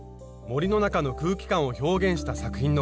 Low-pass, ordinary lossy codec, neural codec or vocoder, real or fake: none; none; none; real